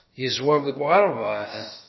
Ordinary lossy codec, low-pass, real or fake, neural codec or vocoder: MP3, 24 kbps; 7.2 kHz; fake; codec, 16 kHz, about 1 kbps, DyCAST, with the encoder's durations